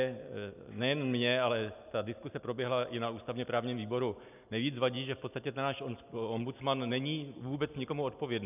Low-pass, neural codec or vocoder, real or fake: 3.6 kHz; none; real